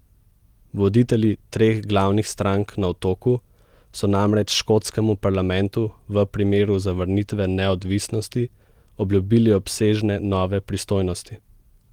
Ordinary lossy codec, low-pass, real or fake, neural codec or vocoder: Opus, 24 kbps; 19.8 kHz; real; none